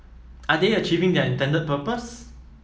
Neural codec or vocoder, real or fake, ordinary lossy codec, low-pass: none; real; none; none